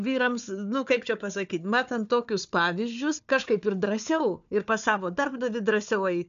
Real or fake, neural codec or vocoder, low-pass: fake; codec, 16 kHz, 4 kbps, FunCodec, trained on Chinese and English, 50 frames a second; 7.2 kHz